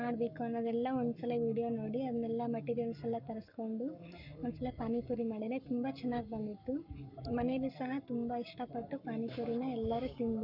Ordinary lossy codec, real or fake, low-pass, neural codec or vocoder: none; fake; 5.4 kHz; codec, 44.1 kHz, 7.8 kbps, Pupu-Codec